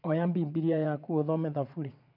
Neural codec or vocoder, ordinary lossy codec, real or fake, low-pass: vocoder, 22.05 kHz, 80 mel bands, WaveNeXt; none; fake; 5.4 kHz